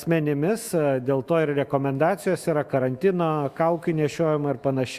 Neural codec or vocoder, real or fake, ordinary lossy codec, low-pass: autoencoder, 48 kHz, 128 numbers a frame, DAC-VAE, trained on Japanese speech; fake; Opus, 64 kbps; 14.4 kHz